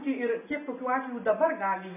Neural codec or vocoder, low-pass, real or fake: none; 3.6 kHz; real